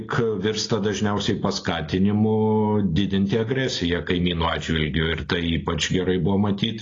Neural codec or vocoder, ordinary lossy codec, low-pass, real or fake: none; AAC, 32 kbps; 7.2 kHz; real